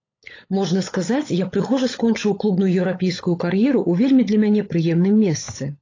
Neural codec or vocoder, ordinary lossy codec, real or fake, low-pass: codec, 16 kHz, 16 kbps, FunCodec, trained on LibriTTS, 50 frames a second; AAC, 32 kbps; fake; 7.2 kHz